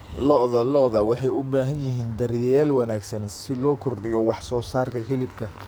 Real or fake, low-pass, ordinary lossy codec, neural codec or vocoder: fake; none; none; codec, 44.1 kHz, 2.6 kbps, SNAC